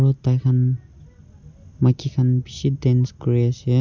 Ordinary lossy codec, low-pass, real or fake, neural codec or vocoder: none; 7.2 kHz; real; none